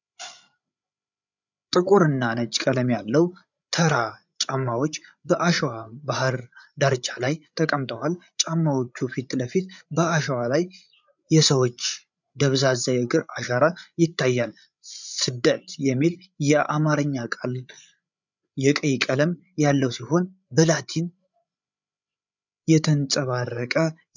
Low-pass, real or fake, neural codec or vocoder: 7.2 kHz; fake; codec, 16 kHz, 8 kbps, FreqCodec, larger model